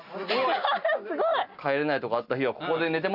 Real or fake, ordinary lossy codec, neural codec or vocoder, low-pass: real; none; none; 5.4 kHz